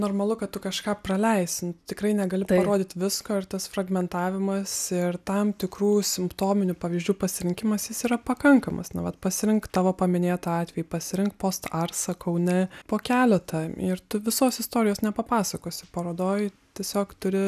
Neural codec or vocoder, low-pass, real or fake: none; 14.4 kHz; real